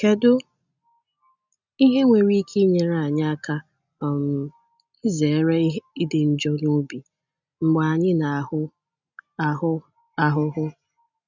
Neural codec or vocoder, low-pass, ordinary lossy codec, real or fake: none; 7.2 kHz; none; real